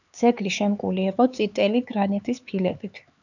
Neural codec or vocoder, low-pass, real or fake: codec, 16 kHz, 4 kbps, X-Codec, HuBERT features, trained on LibriSpeech; 7.2 kHz; fake